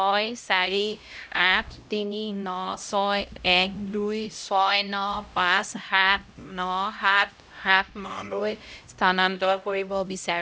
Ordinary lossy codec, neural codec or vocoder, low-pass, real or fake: none; codec, 16 kHz, 0.5 kbps, X-Codec, HuBERT features, trained on LibriSpeech; none; fake